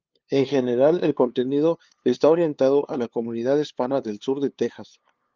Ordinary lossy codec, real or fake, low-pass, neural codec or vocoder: Opus, 32 kbps; fake; 7.2 kHz; codec, 16 kHz, 2 kbps, FunCodec, trained on LibriTTS, 25 frames a second